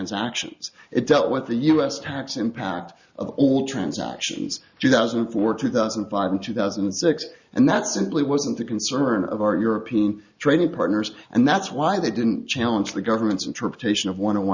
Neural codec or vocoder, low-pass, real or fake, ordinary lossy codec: none; 7.2 kHz; real; Opus, 64 kbps